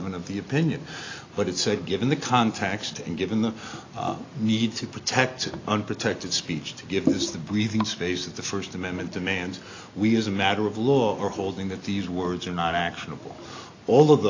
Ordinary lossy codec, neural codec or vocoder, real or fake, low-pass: AAC, 32 kbps; none; real; 7.2 kHz